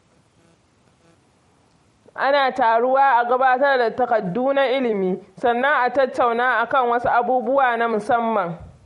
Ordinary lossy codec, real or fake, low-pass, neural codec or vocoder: MP3, 48 kbps; fake; 19.8 kHz; vocoder, 44.1 kHz, 128 mel bands every 256 samples, BigVGAN v2